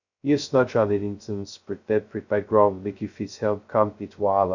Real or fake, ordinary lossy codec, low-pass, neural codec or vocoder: fake; none; 7.2 kHz; codec, 16 kHz, 0.2 kbps, FocalCodec